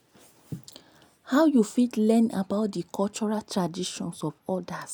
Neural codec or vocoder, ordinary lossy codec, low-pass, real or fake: none; none; none; real